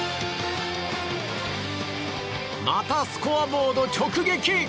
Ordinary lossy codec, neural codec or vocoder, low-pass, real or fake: none; none; none; real